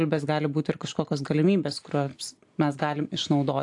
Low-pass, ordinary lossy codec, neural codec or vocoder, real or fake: 10.8 kHz; AAC, 64 kbps; none; real